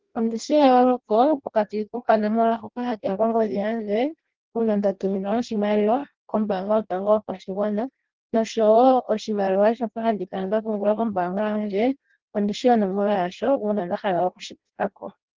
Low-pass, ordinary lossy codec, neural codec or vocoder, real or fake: 7.2 kHz; Opus, 16 kbps; codec, 16 kHz in and 24 kHz out, 0.6 kbps, FireRedTTS-2 codec; fake